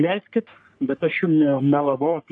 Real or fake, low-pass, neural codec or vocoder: fake; 9.9 kHz; codec, 44.1 kHz, 3.4 kbps, Pupu-Codec